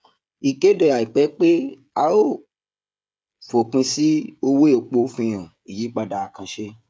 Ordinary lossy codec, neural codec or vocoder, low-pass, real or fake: none; codec, 16 kHz, 16 kbps, FreqCodec, smaller model; none; fake